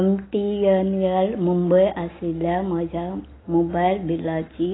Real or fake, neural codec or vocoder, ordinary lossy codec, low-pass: real; none; AAC, 16 kbps; 7.2 kHz